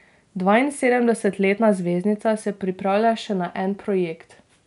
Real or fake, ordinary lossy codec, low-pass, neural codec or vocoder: real; none; 10.8 kHz; none